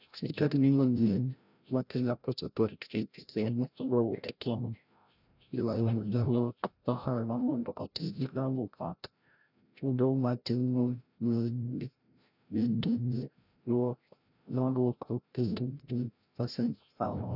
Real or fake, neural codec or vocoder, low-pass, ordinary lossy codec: fake; codec, 16 kHz, 0.5 kbps, FreqCodec, larger model; 5.4 kHz; none